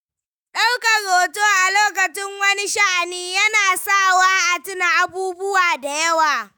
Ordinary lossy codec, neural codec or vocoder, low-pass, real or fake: none; autoencoder, 48 kHz, 128 numbers a frame, DAC-VAE, trained on Japanese speech; none; fake